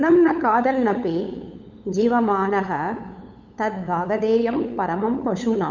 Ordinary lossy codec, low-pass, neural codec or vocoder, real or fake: none; 7.2 kHz; codec, 16 kHz, 8 kbps, FunCodec, trained on LibriTTS, 25 frames a second; fake